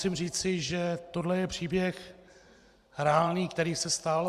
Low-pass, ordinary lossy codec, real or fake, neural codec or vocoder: 14.4 kHz; Opus, 64 kbps; fake; vocoder, 44.1 kHz, 128 mel bands every 512 samples, BigVGAN v2